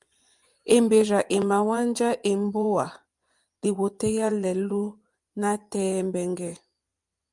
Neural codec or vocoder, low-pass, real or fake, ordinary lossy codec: vocoder, 24 kHz, 100 mel bands, Vocos; 10.8 kHz; fake; Opus, 32 kbps